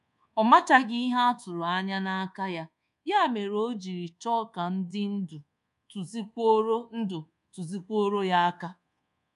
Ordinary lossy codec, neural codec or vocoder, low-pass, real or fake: none; codec, 24 kHz, 1.2 kbps, DualCodec; 10.8 kHz; fake